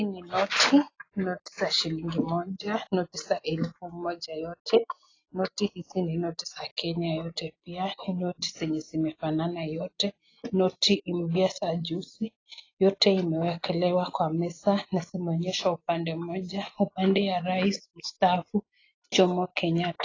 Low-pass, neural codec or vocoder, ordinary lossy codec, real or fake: 7.2 kHz; none; AAC, 32 kbps; real